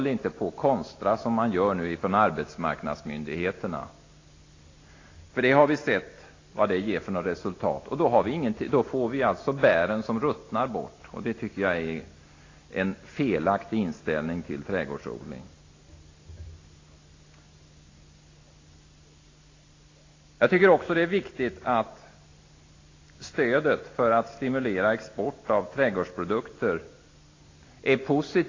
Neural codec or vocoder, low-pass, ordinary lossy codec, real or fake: none; 7.2 kHz; AAC, 32 kbps; real